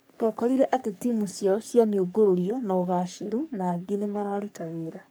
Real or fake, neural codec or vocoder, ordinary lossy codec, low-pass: fake; codec, 44.1 kHz, 3.4 kbps, Pupu-Codec; none; none